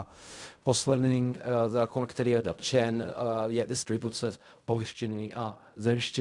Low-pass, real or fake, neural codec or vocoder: 10.8 kHz; fake; codec, 16 kHz in and 24 kHz out, 0.4 kbps, LongCat-Audio-Codec, fine tuned four codebook decoder